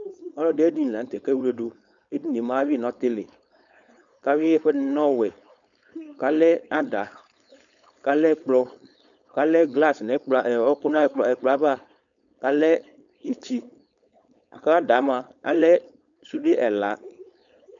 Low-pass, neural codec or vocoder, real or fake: 7.2 kHz; codec, 16 kHz, 4.8 kbps, FACodec; fake